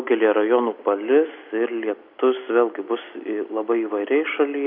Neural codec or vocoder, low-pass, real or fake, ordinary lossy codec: none; 5.4 kHz; real; MP3, 48 kbps